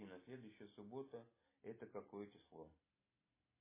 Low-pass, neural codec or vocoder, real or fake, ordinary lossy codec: 3.6 kHz; none; real; MP3, 16 kbps